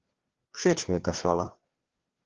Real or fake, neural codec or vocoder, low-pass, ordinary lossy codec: fake; codec, 16 kHz, 2 kbps, FreqCodec, larger model; 7.2 kHz; Opus, 16 kbps